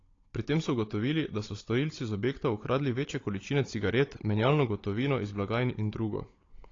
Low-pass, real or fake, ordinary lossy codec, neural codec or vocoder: 7.2 kHz; fake; AAC, 32 kbps; codec, 16 kHz, 16 kbps, FunCodec, trained on Chinese and English, 50 frames a second